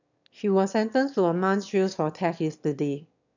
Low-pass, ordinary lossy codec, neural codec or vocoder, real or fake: 7.2 kHz; none; autoencoder, 22.05 kHz, a latent of 192 numbers a frame, VITS, trained on one speaker; fake